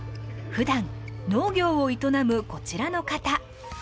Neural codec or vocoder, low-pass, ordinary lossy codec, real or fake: none; none; none; real